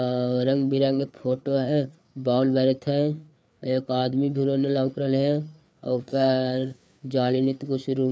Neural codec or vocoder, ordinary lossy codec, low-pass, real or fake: codec, 16 kHz, 4 kbps, FreqCodec, larger model; none; none; fake